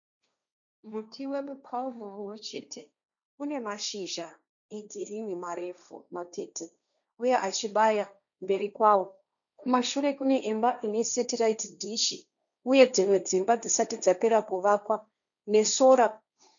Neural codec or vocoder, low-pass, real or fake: codec, 16 kHz, 1.1 kbps, Voila-Tokenizer; 7.2 kHz; fake